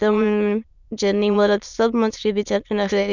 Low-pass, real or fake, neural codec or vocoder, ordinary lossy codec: 7.2 kHz; fake; autoencoder, 22.05 kHz, a latent of 192 numbers a frame, VITS, trained on many speakers; none